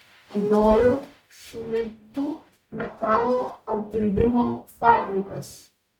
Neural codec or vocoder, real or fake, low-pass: codec, 44.1 kHz, 0.9 kbps, DAC; fake; 19.8 kHz